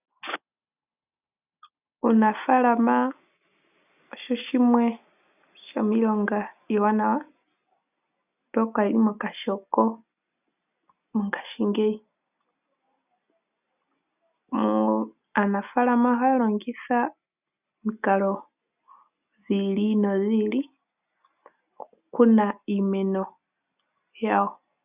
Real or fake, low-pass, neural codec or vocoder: real; 3.6 kHz; none